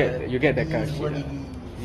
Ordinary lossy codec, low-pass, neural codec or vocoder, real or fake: AAC, 32 kbps; 19.8 kHz; none; real